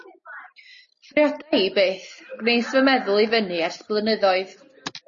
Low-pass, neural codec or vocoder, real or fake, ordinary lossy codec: 7.2 kHz; none; real; MP3, 32 kbps